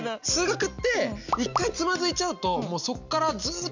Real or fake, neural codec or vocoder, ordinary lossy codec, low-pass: real; none; none; 7.2 kHz